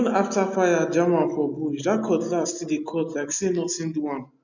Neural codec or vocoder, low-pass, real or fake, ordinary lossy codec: none; 7.2 kHz; real; none